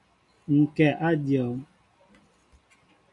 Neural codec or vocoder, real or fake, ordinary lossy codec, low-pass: none; real; MP3, 64 kbps; 10.8 kHz